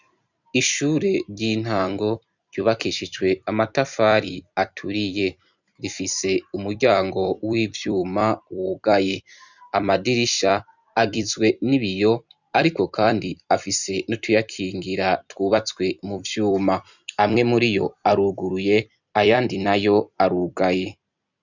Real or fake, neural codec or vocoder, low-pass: fake; vocoder, 44.1 kHz, 128 mel bands every 256 samples, BigVGAN v2; 7.2 kHz